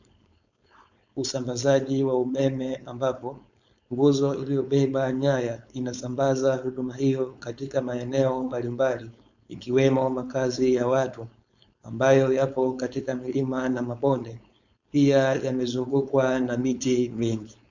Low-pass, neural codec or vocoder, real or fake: 7.2 kHz; codec, 16 kHz, 4.8 kbps, FACodec; fake